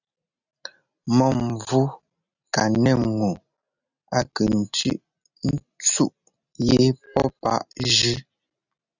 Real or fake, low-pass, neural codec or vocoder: real; 7.2 kHz; none